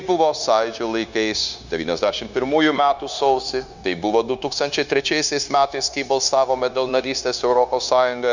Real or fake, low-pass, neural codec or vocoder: fake; 7.2 kHz; codec, 16 kHz, 0.9 kbps, LongCat-Audio-Codec